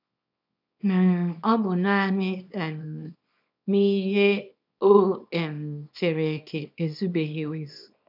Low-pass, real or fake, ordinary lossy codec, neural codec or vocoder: 5.4 kHz; fake; none; codec, 24 kHz, 0.9 kbps, WavTokenizer, small release